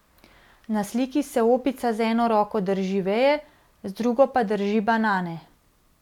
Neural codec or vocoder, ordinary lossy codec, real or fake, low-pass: none; none; real; 19.8 kHz